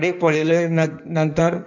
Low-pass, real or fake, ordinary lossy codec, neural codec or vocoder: 7.2 kHz; fake; none; codec, 16 kHz in and 24 kHz out, 1.1 kbps, FireRedTTS-2 codec